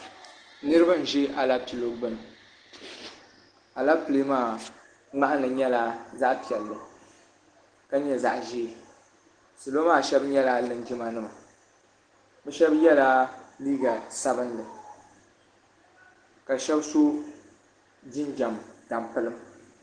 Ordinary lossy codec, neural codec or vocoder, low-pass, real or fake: Opus, 16 kbps; none; 9.9 kHz; real